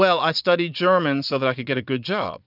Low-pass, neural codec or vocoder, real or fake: 5.4 kHz; vocoder, 22.05 kHz, 80 mel bands, Vocos; fake